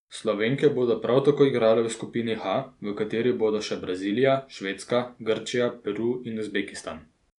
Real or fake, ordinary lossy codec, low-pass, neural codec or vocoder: real; MP3, 96 kbps; 10.8 kHz; none